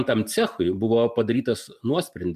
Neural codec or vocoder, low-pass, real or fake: none; 14.4 kHz; real